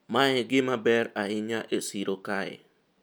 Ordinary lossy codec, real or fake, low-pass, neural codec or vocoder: none; real; none; none